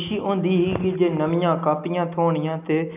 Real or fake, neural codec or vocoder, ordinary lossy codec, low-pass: real; none; none; 3.6 kHz